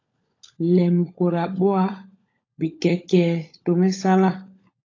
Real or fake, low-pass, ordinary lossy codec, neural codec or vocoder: fake; 7.2 kHz; AAC, 32 kbps; codec, 16 kHz, 16 kbps, FunCodec, trained on LibriTTS, 50 frames a second